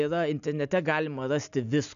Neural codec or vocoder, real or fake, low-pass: none; real; 7.2 kHz